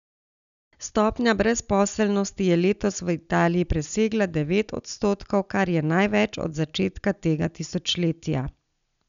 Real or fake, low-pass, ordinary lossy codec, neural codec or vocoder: real; 7.2 kHz; none; none